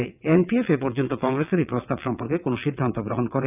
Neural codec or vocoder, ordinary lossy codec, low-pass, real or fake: vocoder, 22.05 kHz, 80 mel bands, WaveNeXt; none; 3.6 kHz; fake